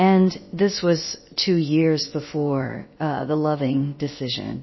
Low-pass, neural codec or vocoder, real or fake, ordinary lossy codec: 7.2 kHz; codec, 16 kHz, about 1 kbps, DyCAST, with the encoder's durations; fake; MP3, 24 kbps